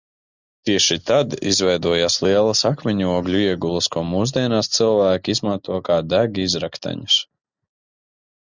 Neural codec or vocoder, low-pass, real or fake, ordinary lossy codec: none; 7.2 kHz; real; Opus, 64 kbps